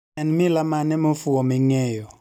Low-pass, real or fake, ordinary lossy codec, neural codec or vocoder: 19.8 kHz; real; none; none